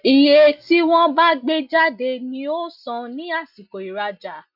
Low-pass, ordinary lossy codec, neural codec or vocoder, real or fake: 5.4 kHz; none; none; real